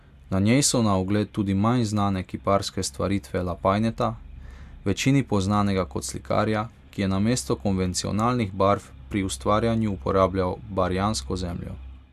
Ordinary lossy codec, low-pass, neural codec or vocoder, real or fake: AAC, 96 kbps; 14.4 kHz; none; real